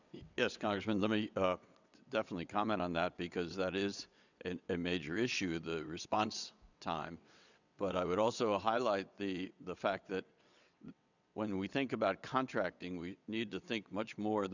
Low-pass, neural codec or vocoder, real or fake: 7.2 kHz; vocoder, 22.05 kHz, 80 mel bands, WaveNeXt; fake